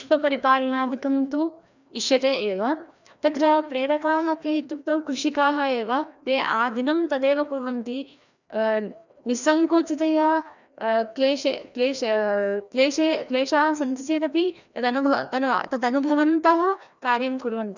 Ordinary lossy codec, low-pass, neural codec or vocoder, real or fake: none; 7.2 kHz; codec, 16 kHz, 1 kbps, FreqCodec, larger model; fake